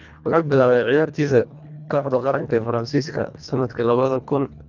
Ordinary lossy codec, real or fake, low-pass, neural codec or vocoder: none; fake; 7.2 kHz; codec, 24 kHz, 1.5 kbps, HILCodec